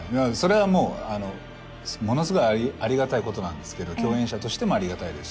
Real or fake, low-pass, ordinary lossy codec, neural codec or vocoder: real; none; none; none